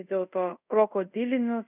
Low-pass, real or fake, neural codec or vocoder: 3.6 kHz; fake; codec, 24 kHz, 0.5 kbps, DualCodec